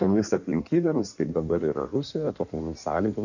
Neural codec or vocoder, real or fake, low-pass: codec, 16 kHz in and 24 kHz out, 1.1 kbps, FireRedTTS-2 codec; fake; 7.2 kHz